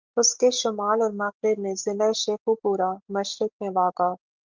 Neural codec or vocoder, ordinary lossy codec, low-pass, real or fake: none; Opus, 32 kbps; 7.2 kHz; real